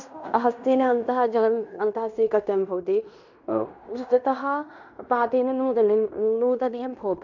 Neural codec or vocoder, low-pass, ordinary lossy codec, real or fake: codec, 16 kHz in and 24 kHz out, 0.9 kbps, LongCat-Audio-Codec, fine tuned four codebook decoder; 7.2 kHz; none; fake